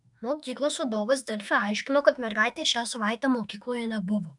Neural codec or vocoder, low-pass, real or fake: autoencoder, 48 kHz, 32 numbers a frame, DAC-VAE, trained on Japanese speech; 10.8 kHz; fake